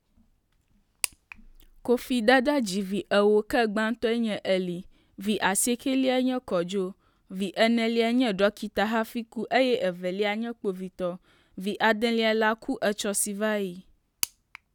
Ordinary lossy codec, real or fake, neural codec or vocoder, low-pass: none; real; none; 19.8 kHz